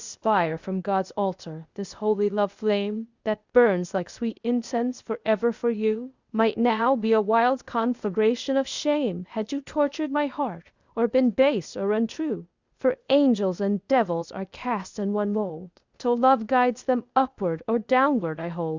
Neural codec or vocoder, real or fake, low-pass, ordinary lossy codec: codec, 16 kHz, 0.8 kbps, ZipCodec; fake; 7.2 kHz; Opus, 64 kbps